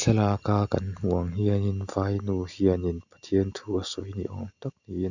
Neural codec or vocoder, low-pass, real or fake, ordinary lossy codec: none; 7.2 kHz; real; none